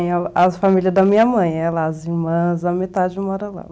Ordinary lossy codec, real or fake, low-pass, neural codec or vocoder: none; real; none; none